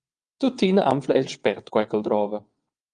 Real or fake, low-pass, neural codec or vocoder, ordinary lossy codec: fake; 9.9 kHz; vocoder, 22.05 kHz, 80 mel bands, WaveNeXt; Opus, 32 kbps